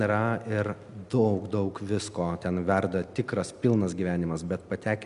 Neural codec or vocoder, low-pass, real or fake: none; 10.8 kHz; real